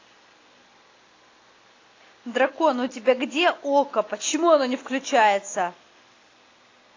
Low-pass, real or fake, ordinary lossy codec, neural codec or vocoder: 7.2 kHz; real; AAC, 32 kbps; none